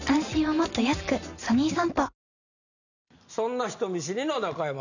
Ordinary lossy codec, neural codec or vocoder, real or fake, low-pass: none; none; real; 7.2 kHz